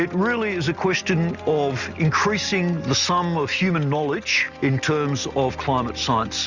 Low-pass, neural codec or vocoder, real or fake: 7.2 kHz; none; real